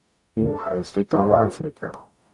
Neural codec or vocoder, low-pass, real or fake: codec, 44.1 kHz, 0.9 kbps, DAC; 10.8 kHz; fake